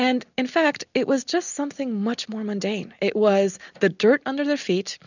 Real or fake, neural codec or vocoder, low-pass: real; none; 7.2 kHz